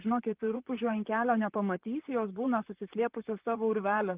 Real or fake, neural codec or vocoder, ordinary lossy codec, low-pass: fake; vocoder, 44.1 kHz, 128 mel bands, Pupu-Vocoder; Opus, 24 kbps; 3.6 kHz